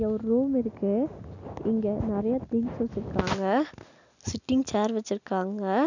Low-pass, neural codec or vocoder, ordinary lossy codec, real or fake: 7.2 kHz; none; none; real